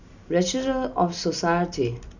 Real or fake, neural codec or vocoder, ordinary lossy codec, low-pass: real; none; none; 7.2 kHz